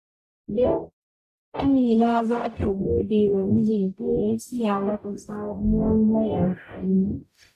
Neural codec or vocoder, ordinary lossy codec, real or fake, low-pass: codec, 44.1 kHz, 0.9 kbps, DAC; none; fake; 14.4 kHz